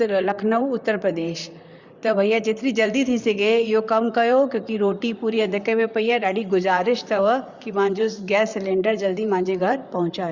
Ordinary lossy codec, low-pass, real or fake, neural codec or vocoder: Opus, 64 kbps; 7.2 kHz; fake; vocoder, 44.1 kHz, 128 mel bands, Pupu-Vocoder